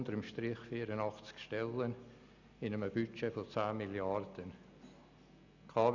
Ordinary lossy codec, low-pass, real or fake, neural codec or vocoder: none; 7.2 kHz; real; none